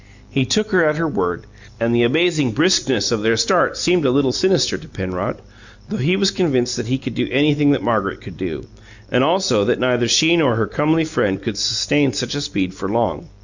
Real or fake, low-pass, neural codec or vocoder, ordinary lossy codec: real; 7.2 kHz; none; Opus, 64 kbps